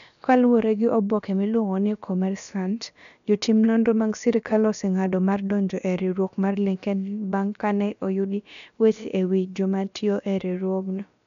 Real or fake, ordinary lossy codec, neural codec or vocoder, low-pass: fake; none; codec, 16 kHz, about 1 kbps, DyCAST, with the encoder's durations; 7.2 kHz